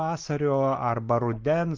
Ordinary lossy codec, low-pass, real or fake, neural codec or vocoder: Opus, 32 kbps; 7.2 kHz; real; none